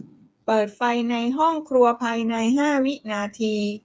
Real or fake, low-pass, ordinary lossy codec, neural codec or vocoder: fake; none; none; codec, 16 kHz, 8 kbps, FreqCodec, smaller model